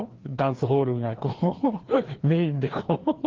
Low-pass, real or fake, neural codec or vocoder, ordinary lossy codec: 7.2 kHz; fake; codec, 16 kHz, 2 kbps, FreqCodec, larger model; Opus, 16 kbps